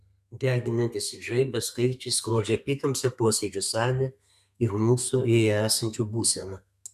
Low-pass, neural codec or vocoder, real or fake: 14.4 kHz; codec, 32 kHz, 1.9 kbps, SNAC; fake